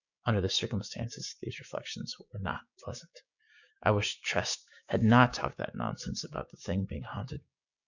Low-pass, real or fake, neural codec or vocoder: 7.2 kHz; fake; codec, 16 kHz, 6 kbps, DAC